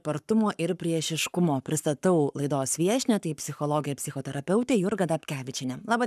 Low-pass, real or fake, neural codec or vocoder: 14.4 kHz; fake; codec, 44.1 kHz, 7.8 kbps, Pupu-Codec